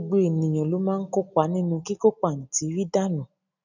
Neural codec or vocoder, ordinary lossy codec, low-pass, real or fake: none; none; 7.2 kHz; real